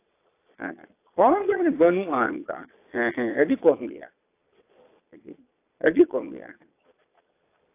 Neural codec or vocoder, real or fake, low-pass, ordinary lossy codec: vocoder, 22.05 kHz, 80 mel bands, Vocos; fake; 3.6 kHz; AAC, 24 kbps